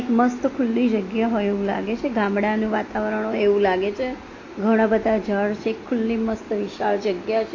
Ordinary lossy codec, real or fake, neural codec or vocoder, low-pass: AAC, 32 kbps; real; none; 7.2 kHz